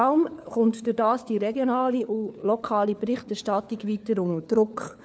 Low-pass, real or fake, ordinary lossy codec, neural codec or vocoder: none; fake; none; codec, 16 kHz, 4 kbps, FunCodec, trained on LibriTTS, 50 frames a second